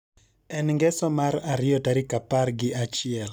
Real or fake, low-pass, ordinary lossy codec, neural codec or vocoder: real; none; none; none